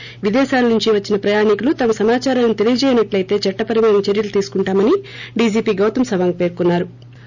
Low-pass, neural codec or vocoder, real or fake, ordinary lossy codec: 7.2 kHz; none; real; none